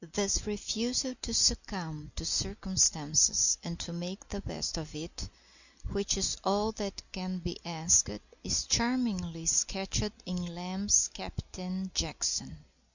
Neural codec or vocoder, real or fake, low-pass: none; real; 7.2 kHz